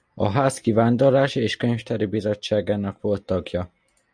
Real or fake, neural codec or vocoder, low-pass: real; none; 9.9 kHz